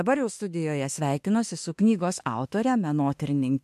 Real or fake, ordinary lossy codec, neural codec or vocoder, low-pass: fake; MP3, 64 kbps; autoencoder, 48 kHz, 32 numbers a frame, DAC-VAE, trained on Japanese speech; 14.4 kHz